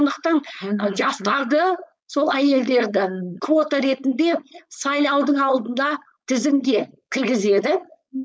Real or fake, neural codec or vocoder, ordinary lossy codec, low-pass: fake; codec, 16 kHz, 4.8 kbps, FACodec; none; none